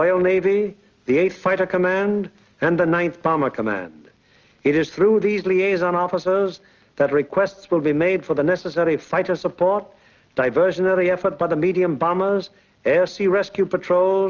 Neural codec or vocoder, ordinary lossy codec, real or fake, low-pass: none; Opus, 32 kbps; real; 7.2 kHz